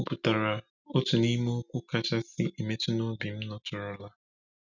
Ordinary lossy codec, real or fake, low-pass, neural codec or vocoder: none; real; 7.2 kHz; none